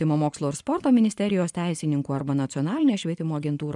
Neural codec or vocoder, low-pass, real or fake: vocoder, 24 kHz, 100 mel bands, Vocos; 10.8 kHz; fake